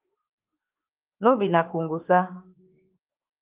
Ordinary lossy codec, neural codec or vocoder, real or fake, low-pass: Opus, 32 kbps; autoencoder, 48 kHz, 32 numbers a frame, DAC-VAE, trained on Japanese speech; fake; 3.6 kHz